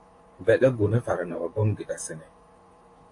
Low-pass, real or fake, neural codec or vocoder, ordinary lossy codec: 10.8 kHz; fake; vocoder, 44.1 kHz, 128 mel bands, Pupu-Vocoder; AAC, 48 kbps